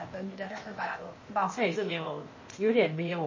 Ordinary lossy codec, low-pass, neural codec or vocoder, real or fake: MP3, 32 kbps; 7.2 kHz; codec, 16 kHz, 0.8 kbps, ZipCodec; fake